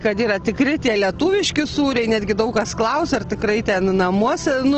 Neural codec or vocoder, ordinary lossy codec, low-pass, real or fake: none; Opus, 16 kbps; 7.2 kHz; real